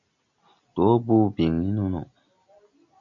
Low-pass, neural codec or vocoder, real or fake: 7.2 kHz; none; real